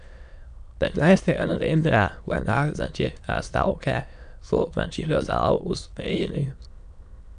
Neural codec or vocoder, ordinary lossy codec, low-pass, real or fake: autoencoder, 22.05 kHz, a latent of 192 numbers a frame, VITS, trained on many speakers; none; 9.9 kHz; fake